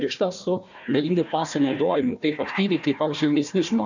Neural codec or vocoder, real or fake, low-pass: codec, 16 kHz, 1 kbps, FunCodec, trained on Chinese and English, 50 frames a second; fake; 7.2 kHz